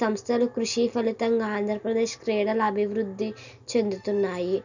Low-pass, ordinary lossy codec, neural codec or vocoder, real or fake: 7.2 kHz; none; none; real